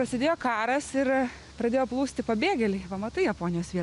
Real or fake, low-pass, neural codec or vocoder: real; 10.8 kHz; none